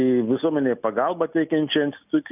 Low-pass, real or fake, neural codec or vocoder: 3.6 kHz; real; none